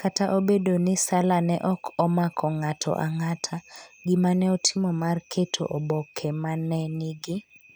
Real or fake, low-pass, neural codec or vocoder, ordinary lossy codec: real; none; none; none